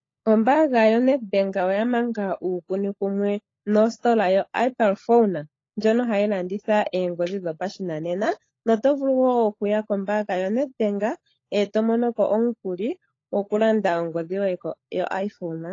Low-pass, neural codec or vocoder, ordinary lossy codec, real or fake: 7.2 kHz; codec, 16 kHz, 16 kbps, FunCodec, trained on LibriTTS, 50 frames a second; AAC, 32 kbps; fake